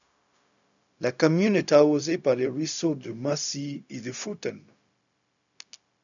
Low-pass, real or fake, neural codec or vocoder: 7.2 kHz; fake; codec, 16 kHz, 0.4 kbps, LongCat-Audio-Codec